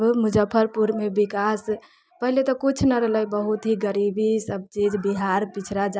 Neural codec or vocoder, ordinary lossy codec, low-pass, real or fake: none; none; none; real